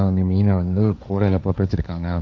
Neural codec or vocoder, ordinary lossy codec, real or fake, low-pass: codec, 16 kHz, 1.1 kbps, Voila-Tokenizer; none; fake; none